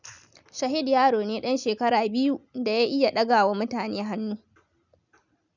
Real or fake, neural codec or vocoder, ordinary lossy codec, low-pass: real; none; none; 7.2 kHz